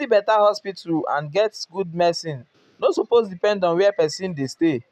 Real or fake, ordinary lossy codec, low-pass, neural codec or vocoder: real; none; 14.4 kHz; none